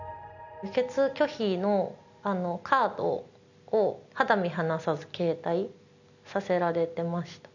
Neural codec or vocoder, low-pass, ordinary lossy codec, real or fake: none; 7.2 kHz; none; real